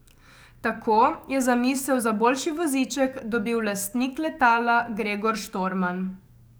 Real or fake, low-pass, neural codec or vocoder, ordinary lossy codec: fake; none; codec, 44.1 kHz, 7.8 kbps, DAC; none